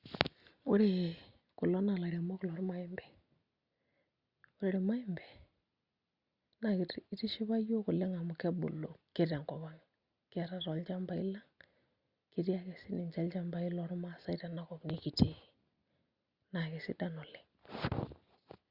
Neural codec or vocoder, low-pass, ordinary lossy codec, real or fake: none; 5.4 kHz; Opus, 64 kbps; real